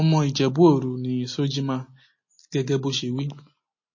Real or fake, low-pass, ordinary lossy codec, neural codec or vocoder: real; 7.2 kHz; MP3, 32 kbps; none